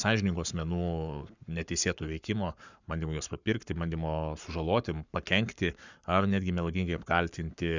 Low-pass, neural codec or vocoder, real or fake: 7.2 kHz; codec, 44.1 kHz, 7.8 kbps, Pupu-Codec; fake